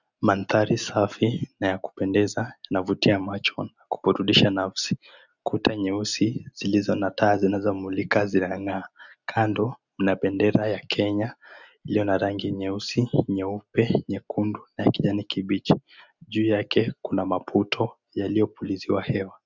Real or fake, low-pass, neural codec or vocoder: real; 7.2 kHz; none